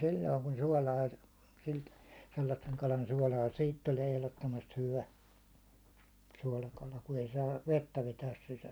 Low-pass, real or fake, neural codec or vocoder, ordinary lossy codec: none; fake; vocoder, 44.1 kHz, 128 mel bands every 256 samples, BigVGAN v2; none